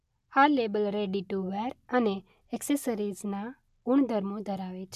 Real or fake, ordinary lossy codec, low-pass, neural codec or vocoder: real; AAC, 96 kbps; 14.4 kHz; none